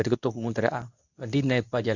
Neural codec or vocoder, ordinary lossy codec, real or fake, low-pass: codec, 24 kHz, 0.9 kbps, WavTokenizer, medium speech release version 2; none; fake; 7.2 kHz